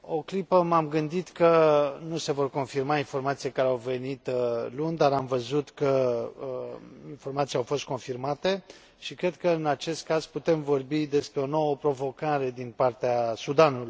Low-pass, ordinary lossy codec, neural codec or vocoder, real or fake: none; none; none; real